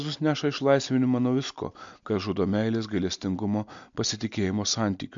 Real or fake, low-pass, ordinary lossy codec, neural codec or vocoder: real; 7.2 kHz; MP3, 64 kbps; none